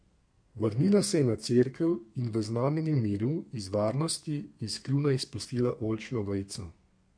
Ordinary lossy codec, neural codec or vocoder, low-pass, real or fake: MP3, 48 kbps; codec, 32 kHz, 1.9 kbps, SNAC; 9.9 kHz; fake